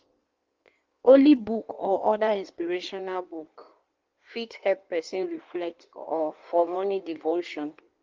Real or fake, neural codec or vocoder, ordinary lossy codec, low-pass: fake; codec, 16 kHz in and 24 kHz out, 1.1 kbps, FireRedTTS-2 codec; Opus, 32 kbps; 7.2 kHz